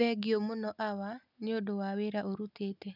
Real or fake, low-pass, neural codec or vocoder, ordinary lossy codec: real; 5.4 kHz; none; none